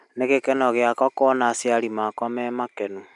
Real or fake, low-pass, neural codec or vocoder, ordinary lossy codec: real; 10.8 kHz; none; none